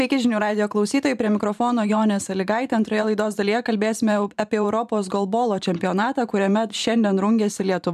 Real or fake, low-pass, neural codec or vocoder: fake; 14.4 kHz; vocoder, 44.1 kHz, 128 mel bands every 512 samples, BigVGAN v2